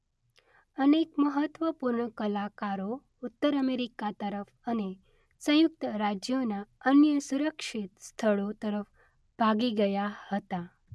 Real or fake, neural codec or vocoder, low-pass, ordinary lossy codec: real; none; none; none